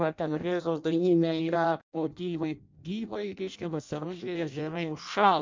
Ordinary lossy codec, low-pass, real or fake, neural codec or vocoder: MP3, 64 kbps; 7.2 kHz; fake; codec, 16 kHz in and 24 kHz out, 0.6 kbps, FireRedTTS-2 codec